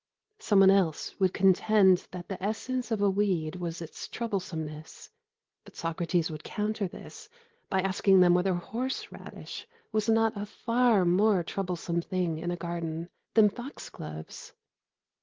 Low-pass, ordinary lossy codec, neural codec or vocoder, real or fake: 7.2 kHz; Opus, 16 kbps; none; real